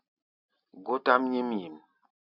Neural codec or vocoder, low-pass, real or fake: none; 5.4 kHz; real